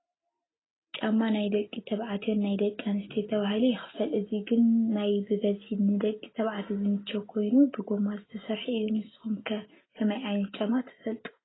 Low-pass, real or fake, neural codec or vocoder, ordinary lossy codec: 7.2 kHz; real; none; AAC, 16 kbps